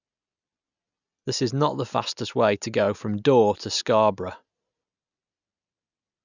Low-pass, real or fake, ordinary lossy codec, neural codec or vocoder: 7.2 kHz; real; none; none